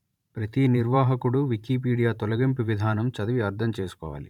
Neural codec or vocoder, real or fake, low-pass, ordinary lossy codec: vocoder, 44.1 kHz, 128 mel bands every 512 samples, BigVGAN v2; fake; 19.8 kHz; none